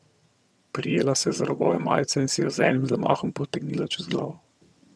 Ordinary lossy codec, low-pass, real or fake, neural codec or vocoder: none; none; fake; vocoder, 22.05 kHz, 80 mel bands, HiFi-GAN